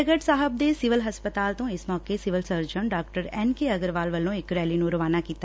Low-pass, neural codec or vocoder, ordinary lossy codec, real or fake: none; none; none; real